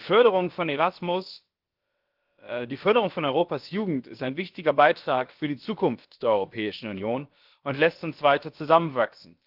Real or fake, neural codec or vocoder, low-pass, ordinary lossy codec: fake; codec, 16 kHz, about 1 kbps, DyCAST, with the encoder's durations; 5.4 kHz; Opus, 32 kbps